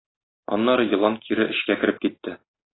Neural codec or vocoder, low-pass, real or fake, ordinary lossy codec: none; 7.2 kHz; real; AAC, 16 kbps